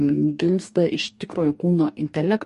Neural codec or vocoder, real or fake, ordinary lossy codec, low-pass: codec, 44.1 kHz, 2.6 kbps, DAC; fake; MP3, 48 kbps; 14.4 kHz